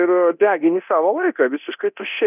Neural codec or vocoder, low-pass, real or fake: codec, 24 kHz, 0.9 kbps, DualCodec; 3.6 kHz; fake